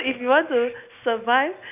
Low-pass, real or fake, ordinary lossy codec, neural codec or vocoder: 3.6 kHz; real; none; none